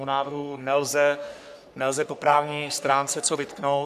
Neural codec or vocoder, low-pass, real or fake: codec, 44.1 kHz, 3.4 kbps, Pupu-Codec; 14.4 kHz; fake